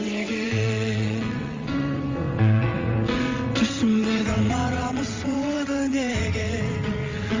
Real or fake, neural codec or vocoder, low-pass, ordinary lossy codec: fake; vocoder, 22.05 kHz, 80 mel bands, WaveNeXt; 7.2 kHz; Opus, 32 kbps